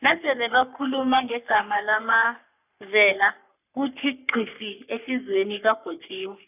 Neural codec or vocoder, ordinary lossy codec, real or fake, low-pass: codec, 44.1 kHz, 3.4 kbps, Pupu-Codec; none; fake; 3.6 kHz